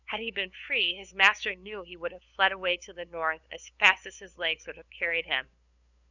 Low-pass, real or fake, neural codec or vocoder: 7.2 kHz; fake; codec, 16 kHz, 8 kbps, FunCodec, trained on LibriTTS, 25 frames a second